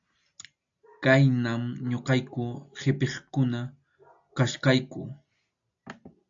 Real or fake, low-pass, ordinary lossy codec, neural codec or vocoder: real; 7.2 kHz; AAC, 64 kbps; none